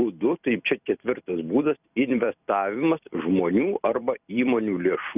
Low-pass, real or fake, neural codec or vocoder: 3.6 kHz; fake; vocoder, 44.1 kHz, 128 mel bands every 256 samples, BigVGAN v2